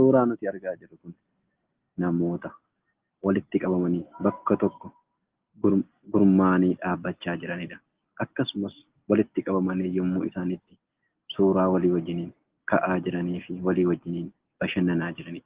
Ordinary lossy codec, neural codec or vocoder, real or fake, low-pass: Opus, 16 kbps; none; real; 3.6 kHz